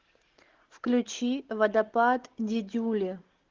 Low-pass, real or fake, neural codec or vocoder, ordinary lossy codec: 7.2 kHz; real; none; Opus, 16 kbps